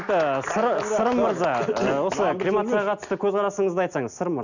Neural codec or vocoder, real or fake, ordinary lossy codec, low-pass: none; real; none; 7.2 kHz